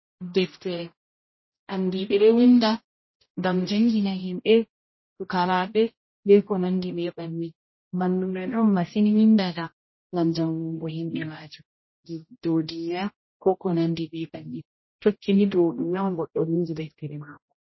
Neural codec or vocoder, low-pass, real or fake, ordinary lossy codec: codec, 16 kHz, 0.5 kbps, X-Codec, HuBERT features, trained on general audio; 7.2 kHz; fake; MP3, 24 kbps